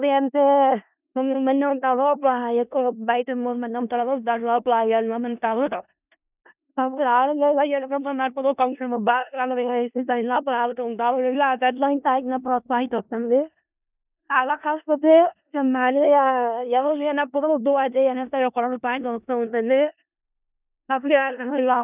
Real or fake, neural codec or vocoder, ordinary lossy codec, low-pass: fake; codec, 16 kHz in and 24 kHz out, 0.4 kbps, LongCat-Audio-Codec, four codebook decoder; none; 3.6 kHz